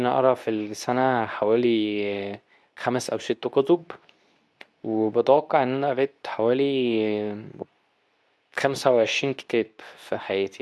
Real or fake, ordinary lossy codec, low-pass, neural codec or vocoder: fake; none; none; codec, 24 kHz, 0.9 kbps, WavTokenizer, medium speech release version 2